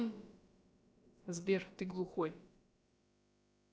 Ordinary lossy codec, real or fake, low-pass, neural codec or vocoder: none; fake; none; codec, 16 kHz, about 1 kbps, DyCAST, with the encoder's durations